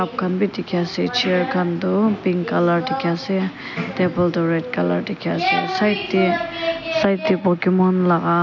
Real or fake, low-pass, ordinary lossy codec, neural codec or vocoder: real; 7.2 kHz; none; none